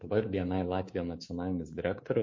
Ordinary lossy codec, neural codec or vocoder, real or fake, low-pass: MP3, 32 kbps; codec, 24 kHz, 3.1 kbps, DualCodec; fake; 7.2 kHz